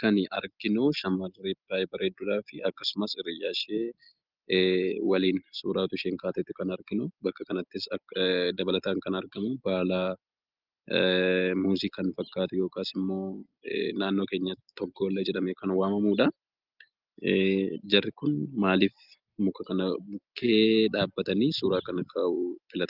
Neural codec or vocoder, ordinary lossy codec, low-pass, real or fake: none; Opus, 32 kbps; 5.4 kHz; real